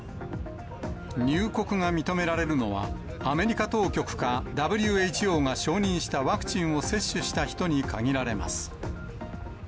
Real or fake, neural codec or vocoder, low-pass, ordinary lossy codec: real; none; none; none